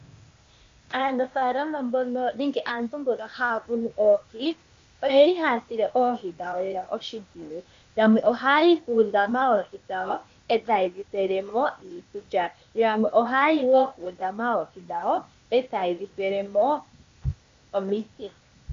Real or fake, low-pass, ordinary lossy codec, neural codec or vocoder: fake; 7.2 kHz; MP3, 48 kbps; codec, 16 kHz, 0.8 kbps, ZipCodec